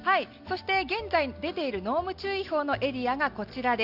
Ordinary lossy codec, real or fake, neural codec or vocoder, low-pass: Opus, 64 kbps; real; none; 5.4 kHz